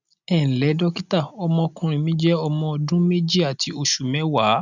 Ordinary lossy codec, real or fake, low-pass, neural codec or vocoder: none; real; 7.2 kHz; none